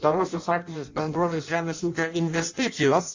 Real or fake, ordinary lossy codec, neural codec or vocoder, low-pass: fake; AAC, 48 kbps; codec, 16 kHz in and 24 kHz out, 0.6 kbps, FireRedTTS-2 codec; 7.2 kHz